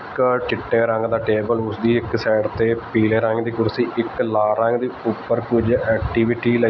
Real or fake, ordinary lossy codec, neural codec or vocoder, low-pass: real; none; none; 7.2 kHz